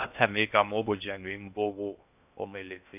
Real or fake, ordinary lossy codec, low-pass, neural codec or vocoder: fake; none; 3.6 kHz; codec, 16 kHz in and 24 kHz out, 0.6 kbps, FocalCodec, streaming, 2048 codes